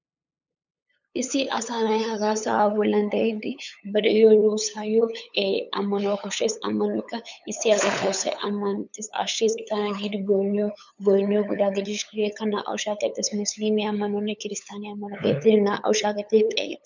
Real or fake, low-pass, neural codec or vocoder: fake; 7.2 kHz; codec, 16 kHz, 8 kbps, FunCodec, trained on LibriTTS, 25 frames a second